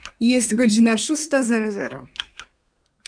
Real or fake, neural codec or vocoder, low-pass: fake; codec, 24 kHz, 1 kbps, SNAC; 9.9 kHz